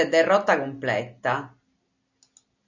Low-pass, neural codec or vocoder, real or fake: 7.2 kHz; none; real